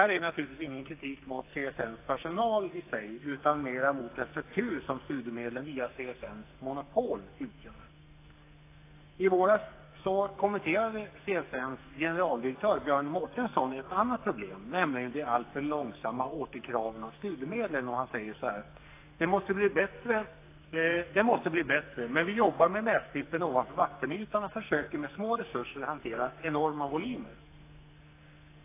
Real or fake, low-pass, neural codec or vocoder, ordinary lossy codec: fake; 3.6 kHz; codec, 44.1 kHz, 2.6 kbps, SNAC; AAC, 24 kbps